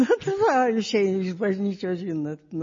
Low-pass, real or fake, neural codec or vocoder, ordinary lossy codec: 7.2 kHz; real; none; MP3, 32 kbps